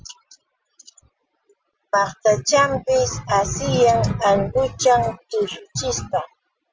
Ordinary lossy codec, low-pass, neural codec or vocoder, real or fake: Opus, 24 kbps; 7.2 kHz; none; real